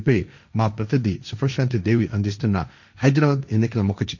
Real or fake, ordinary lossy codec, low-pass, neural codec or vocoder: fake; none; 7.2 kHz; codec, 16 kHz, 1.1 kbps, Voila-Tokenizer